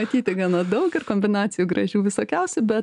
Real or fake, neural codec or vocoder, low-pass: real; none; 10.8 kHz